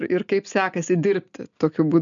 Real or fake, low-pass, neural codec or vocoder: real; 7.2 kHz; none